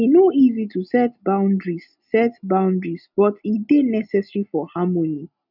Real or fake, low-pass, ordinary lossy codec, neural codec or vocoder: real; 5.4 kHz; none; none